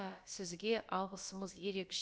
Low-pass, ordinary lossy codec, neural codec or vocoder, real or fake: none; none; codec, 16 kHz, about 1 kbps, DyCAST, with the encoder's durations; fake